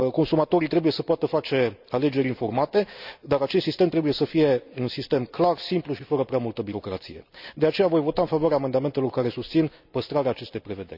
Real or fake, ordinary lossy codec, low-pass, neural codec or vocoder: real; none; 5.4 kHz; none